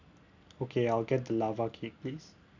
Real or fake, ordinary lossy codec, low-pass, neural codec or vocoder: real; none; 7.2 kHz; none